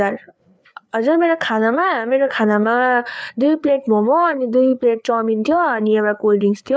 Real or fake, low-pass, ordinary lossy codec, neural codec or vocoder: fake; none; none; codec, 16 kHz, 4 kbps, FreqCodec, larger model